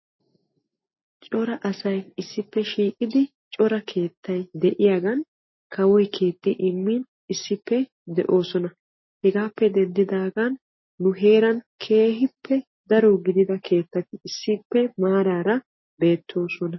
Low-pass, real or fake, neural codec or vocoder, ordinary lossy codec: 7.2 kHz; real; none; MP3, 24 kbps